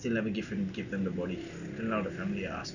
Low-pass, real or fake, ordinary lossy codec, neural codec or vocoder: 7.2 kHz; real; none; none